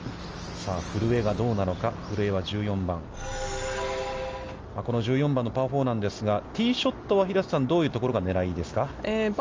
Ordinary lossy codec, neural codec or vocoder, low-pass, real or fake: Opus, 24 kbps; none; 7.2 kHz; real